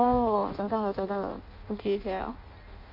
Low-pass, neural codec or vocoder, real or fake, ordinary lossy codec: 5.4 kHz; codec, 16 kHz in and 24 kHz out, 0.6 kbps, FireRedTTS-2 codec; fake; MP3, 32 kbps